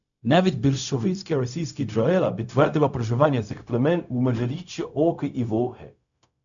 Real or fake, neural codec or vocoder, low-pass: fake; codec, 16 kHz, 0.4 kbps, LongCat-Audio-Codec; 7.2 kHz